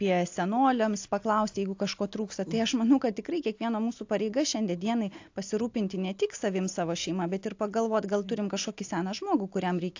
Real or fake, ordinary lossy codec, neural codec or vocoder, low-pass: real; AAC, 48 kbps; none; 7.2 kHz